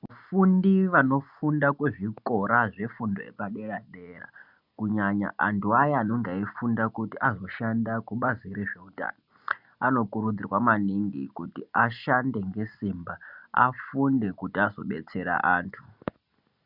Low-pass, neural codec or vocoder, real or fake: 5.4 kHz; none; real